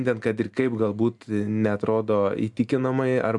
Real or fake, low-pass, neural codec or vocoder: real; 10.8 kHz; none